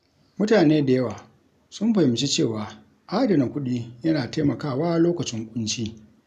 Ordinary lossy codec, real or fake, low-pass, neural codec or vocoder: none; real; 14.4 kHz; none